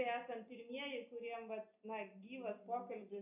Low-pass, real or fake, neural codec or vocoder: 3.6 kHz; real; none